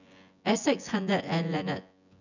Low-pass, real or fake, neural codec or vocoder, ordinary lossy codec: 7.2 kHz; fake; vocoder, 24 kHz, 100 mel bands, Vocos; none